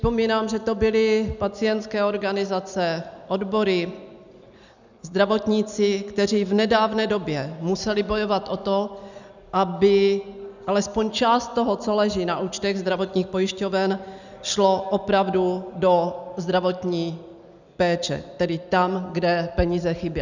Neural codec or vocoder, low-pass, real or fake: none; 7.2 kHz; real